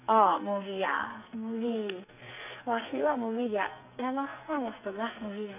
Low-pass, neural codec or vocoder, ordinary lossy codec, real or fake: 3.6 kHz; codec, 44.1 kHz, 2.6 kbps, SNAC; none; fake